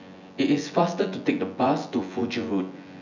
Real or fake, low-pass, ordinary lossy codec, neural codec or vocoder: fake; 7.2 kHz; none; vocoder, 24 kHz, 100 mel bands, Vocos